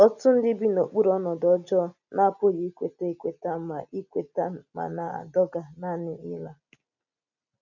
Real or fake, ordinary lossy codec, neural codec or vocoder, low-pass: real; none; none; 7.2 kHz